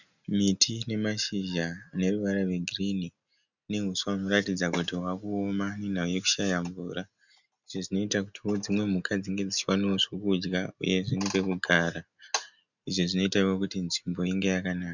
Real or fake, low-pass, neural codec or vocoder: real; 7.2 kHz; none